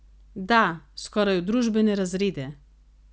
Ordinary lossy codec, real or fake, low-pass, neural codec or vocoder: none; real; none; none